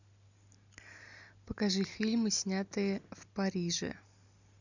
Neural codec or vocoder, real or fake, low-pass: none; real; 7.2 kHz